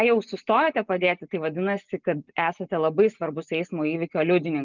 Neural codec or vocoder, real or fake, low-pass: none; real; 7.2 kHz